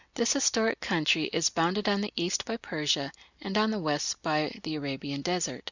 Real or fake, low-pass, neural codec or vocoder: real; 7.2 kHz; none